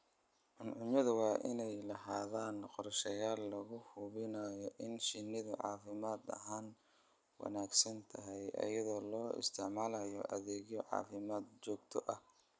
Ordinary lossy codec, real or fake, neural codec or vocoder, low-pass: none; real; none; none